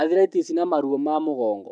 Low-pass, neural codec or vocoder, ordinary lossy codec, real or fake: 9.9 kHz; none; none; real